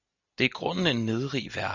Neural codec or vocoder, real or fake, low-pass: none; real; 7.2 kHz